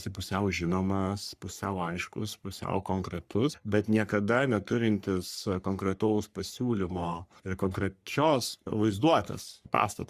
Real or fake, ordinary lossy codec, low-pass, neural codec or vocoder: fake; Opus, 64 kbps; 14.4 kHz; codec, 44.1 kHz, 3.4 kbps, Pupu-Codec